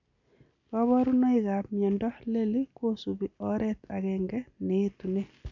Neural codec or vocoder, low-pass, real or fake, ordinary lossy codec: none; 7.2 kHz; real; none